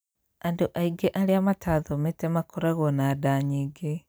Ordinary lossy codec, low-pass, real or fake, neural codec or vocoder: none; none; real; none